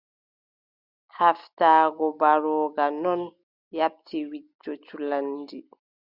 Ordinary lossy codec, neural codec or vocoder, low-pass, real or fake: Opus, 64 kbps; none; 5.4 kHz; real